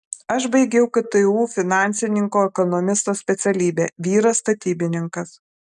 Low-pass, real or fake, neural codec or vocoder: 10.8 kHz; real; none